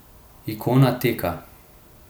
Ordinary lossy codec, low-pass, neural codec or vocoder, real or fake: none; none; none; real